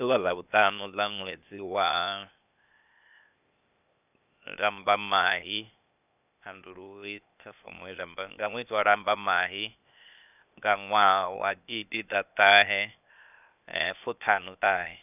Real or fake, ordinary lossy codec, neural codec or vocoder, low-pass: fake; none; codec, 16 kHz, 0.8 kbps, ZipCodec; 3.6 kHz